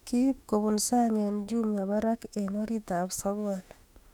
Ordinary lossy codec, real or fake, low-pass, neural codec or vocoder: none; fake; 19.8 kHz; autoencoder, 48 kHz, 32 numbers a frame, DAC-VAE, trained on Japanese speech